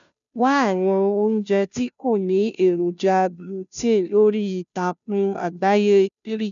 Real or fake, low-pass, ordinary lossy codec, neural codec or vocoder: fake; 7.2 kHz; none; codec, 16 kHz, 0.5 kbps, FunCodec, trained on Chinese and English, 25 frames a second